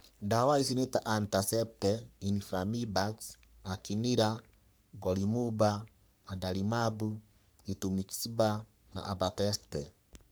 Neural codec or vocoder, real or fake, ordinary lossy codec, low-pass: codec, 44.1 kHz, 3.4 kbps, Pupu-Codec; fake; none; none